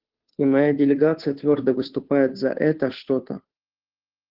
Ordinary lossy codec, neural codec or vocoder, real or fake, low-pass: Opus, 16 kbps; codec, 16 kHz, 2 kbps, FunCodec, trained on Chinese and English, 25 frames a second; fake; 5.4 kHz